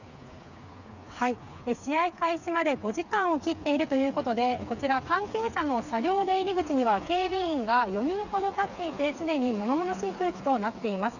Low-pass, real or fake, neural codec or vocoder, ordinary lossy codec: 7.2 kHz; fake; codec, 16 kHz, 4 kbps, FreqCodec, smaller model; none